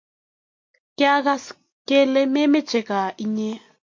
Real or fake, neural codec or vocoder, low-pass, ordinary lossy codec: real; none; 7.2 kHz; MP3, 48 kbps